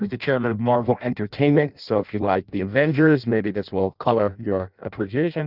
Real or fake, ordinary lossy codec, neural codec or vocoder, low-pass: fake; Opus, 24 kbps; codec, 16 kHz in and 24 kHz out, 0.6 kbps, FireRedTTS-2 codec; 5.4 kHz